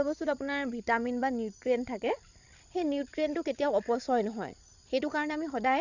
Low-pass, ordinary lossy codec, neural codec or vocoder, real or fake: 7.2 kHz; none; codec, 16 kHz, 16 kbps, FreqCodec, larger model; fake